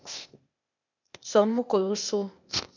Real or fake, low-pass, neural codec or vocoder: fake; 7.2 kHz; codec, 16 kHz, 0.8 kbps, ZipCodec